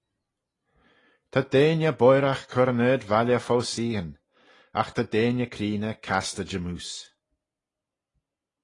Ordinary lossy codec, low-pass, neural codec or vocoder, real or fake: AAC, 32 kbps; 10.8 kHz; none; real